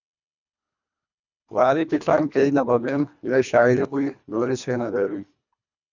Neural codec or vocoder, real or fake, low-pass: codec, 24 kHz, 1.5 kbps, HILCodec; fake; 7.2 kHz